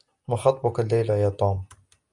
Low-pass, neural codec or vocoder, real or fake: 10.8 kHz; none; real